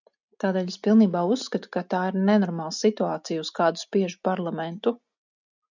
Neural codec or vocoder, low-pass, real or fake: none; 7.2 kHz; real